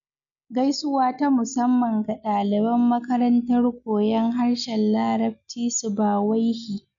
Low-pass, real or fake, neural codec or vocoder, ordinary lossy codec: 7.2 kHz; real; none; none